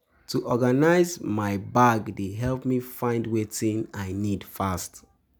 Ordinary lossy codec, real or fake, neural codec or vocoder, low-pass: none; real; none; none